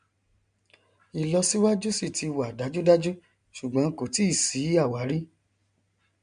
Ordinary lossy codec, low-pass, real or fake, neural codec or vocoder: MP3, 64 kbps; 9.9 kHz; real; none